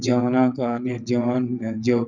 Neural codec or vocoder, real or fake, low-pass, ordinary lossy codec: vocoder, 22.05 kHz, 80 mel bands, WaveNeXt; fake; 7.2 kHz; none